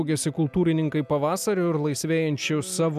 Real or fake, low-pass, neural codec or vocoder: real; 14.4 kHz; none